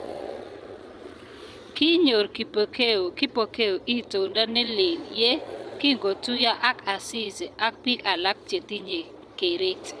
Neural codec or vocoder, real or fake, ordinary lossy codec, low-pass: vocoder, 22.05 kHz, 80 mel bands, Vocos; fake; none; none